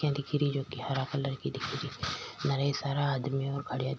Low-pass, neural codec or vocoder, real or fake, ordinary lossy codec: none; none; real; none